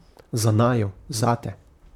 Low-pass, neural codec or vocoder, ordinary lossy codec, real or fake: 19.8 kHz; vocoder, 44.1 kHz, 128 mel bands, Pupu-Vocoder; none; fake